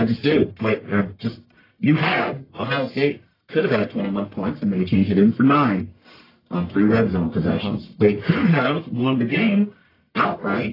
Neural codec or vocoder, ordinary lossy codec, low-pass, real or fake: codec, 44.1 kHz, 1.7 kbps, Pupu-Codec; AAC, 24 kbps; 5.4 kHz; fake